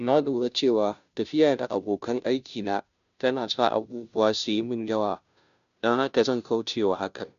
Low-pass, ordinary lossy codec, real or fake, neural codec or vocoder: 7.2 kHz; none; fake; codec, 16 kHz, 0.5 kbps, FunCodec, trained on Chinese and English, 25 frames a second